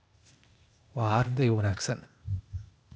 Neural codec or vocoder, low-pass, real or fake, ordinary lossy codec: codec, 16 kHz, 0.8 kbps, ZipCodec; none; fake; none